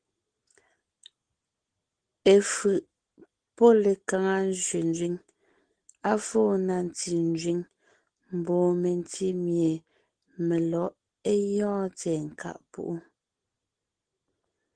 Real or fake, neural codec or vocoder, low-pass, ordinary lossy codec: real; none; 9.9 kHz; Opus, 16 kbps